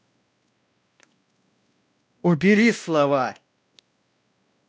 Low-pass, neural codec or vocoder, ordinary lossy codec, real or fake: none; codec, 16 kHz, 1 kbps, X-Codec, WavLM features, trained on Multilingual LibriSpeech; none; fake